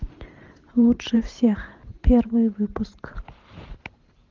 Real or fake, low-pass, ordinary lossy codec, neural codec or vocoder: fake; 7.2 kHz; Opus, 24 kbps; vocoder, 44.1 kHz, 128 mel bands every 512 samples, BigVGAN v2